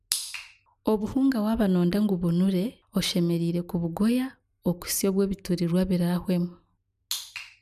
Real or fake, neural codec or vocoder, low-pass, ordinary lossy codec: real; none; 14.4 kHz; none